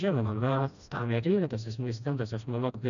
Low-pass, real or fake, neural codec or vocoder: 7.2 kHz; fake; codec, 16 kHz, 1 kbps, FreqCodec, smaller model